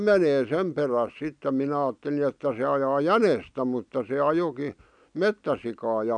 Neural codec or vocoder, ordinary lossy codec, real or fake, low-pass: none; none; real; 9.9 kHz